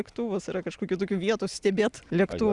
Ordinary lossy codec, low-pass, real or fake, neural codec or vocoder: Opus, 64 kbps; 10.8 kHz; real; none